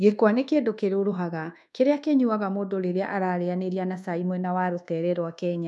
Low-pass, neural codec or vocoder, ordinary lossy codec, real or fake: none; codec, 24 kHz, 1.2 kbps, DualCodec; none; fake